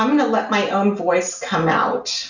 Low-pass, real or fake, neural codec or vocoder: 7.2 kHz; real; none